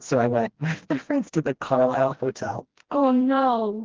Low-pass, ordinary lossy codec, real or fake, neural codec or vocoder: 7.2 kHz; Opus, 16 kbps; fake; codec, 16 kHz, 1 kbps, FreqCodec, smaller model